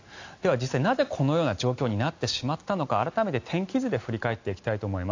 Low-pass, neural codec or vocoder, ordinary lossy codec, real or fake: 7.2 kHz; none; AAC, 48 kbps; real